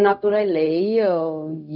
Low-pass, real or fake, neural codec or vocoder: 5.4 kHz; fake; codec, 16 kHz, 0.4 kbps, LongCat-Audio-Codec